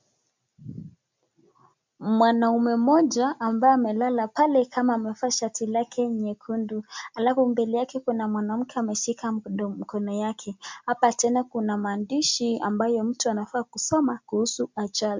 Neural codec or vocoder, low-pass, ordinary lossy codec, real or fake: none; 7.2 kHz; MP3, 64 kbps; real